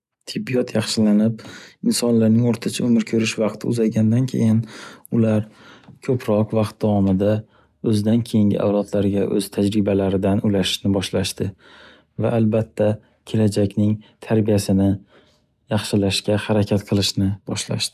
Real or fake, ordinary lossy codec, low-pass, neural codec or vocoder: real; none; 14.4 kHz; none